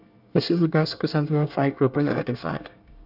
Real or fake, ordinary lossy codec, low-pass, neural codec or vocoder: fake; none; 5.4 kHz; codec, 24 kHz, 1 kbps, SNAC